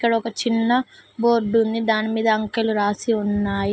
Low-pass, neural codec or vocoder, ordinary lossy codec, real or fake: none; none; none; real